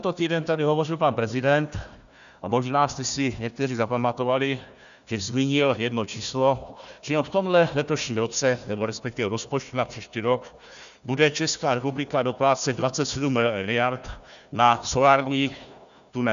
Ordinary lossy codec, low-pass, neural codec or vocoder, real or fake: MP3, 96 kbps; 7.2 kHz; codec, 16 kHz, 1 kbps, FunCodec, trained on Chinese and English, 50 frames a second; fake